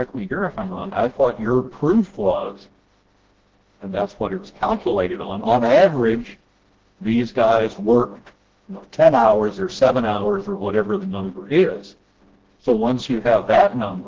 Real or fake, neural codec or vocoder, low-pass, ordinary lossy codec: fake; codec, 16 kHz, 1 kbps, FreqCodec, smaller model; 7.2 kHz; Opus, 16 kbps